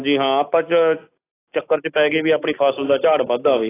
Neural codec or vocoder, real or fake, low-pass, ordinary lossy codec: none; real; 3.6 kHz; AAC, 16 kbps